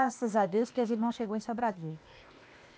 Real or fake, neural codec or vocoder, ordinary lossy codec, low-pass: fake; codec, 16 kHz, 0.8 kbps, ZipCodec; none; none